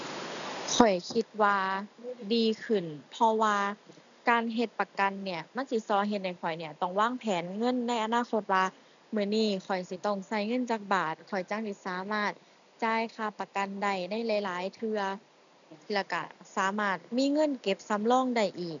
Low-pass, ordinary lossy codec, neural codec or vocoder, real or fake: 7.2 kHz; none; none; real